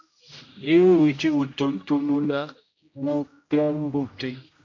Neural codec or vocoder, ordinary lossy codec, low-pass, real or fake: codec, 16 kHz, 0.5 kbps, X-Codec, HuBERT features, trained on general audio; AAC, 48 kbps; 7.2 kHz; fake